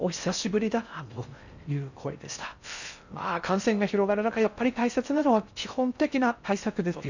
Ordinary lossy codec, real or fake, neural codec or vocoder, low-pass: none; fake; codec, 16 kHz in and 24 kHz out, 0.6 kbps, FocalCodec, streaming, 4096 codes; 7.2 kHz